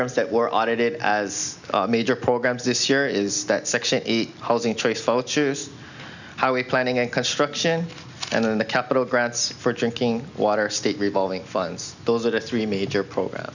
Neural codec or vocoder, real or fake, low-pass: none; real; 7.2 kHz